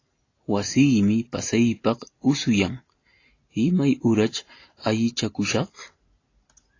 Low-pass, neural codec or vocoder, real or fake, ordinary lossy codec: 7.2 kHz; none; real; AAC, 32 kbps